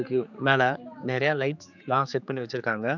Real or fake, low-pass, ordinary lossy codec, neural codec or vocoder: fake; 7.2 kHz; none; codec, 16 kHz, 4 kbps, X-Codec, HuBERT features, trained on general audio